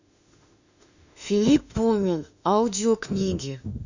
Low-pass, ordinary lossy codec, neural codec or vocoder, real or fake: 7.2 kHz; none; autoencoder, 48 kHz, 32 numbers a frame, DAC-VAE, trained on Japanese speech; fake